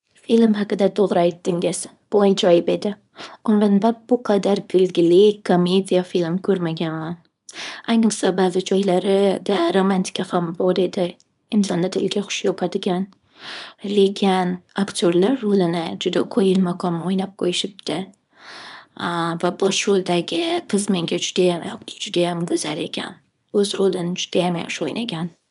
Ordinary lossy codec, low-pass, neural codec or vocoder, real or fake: none; 10.8 kHz; codec, 24 kHz, 0.9 kbps, WavTokenizer, small release; fake